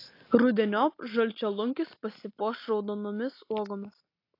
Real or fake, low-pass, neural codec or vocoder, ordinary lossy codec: real; 5.4 kHz; none; AAC, 32 kbps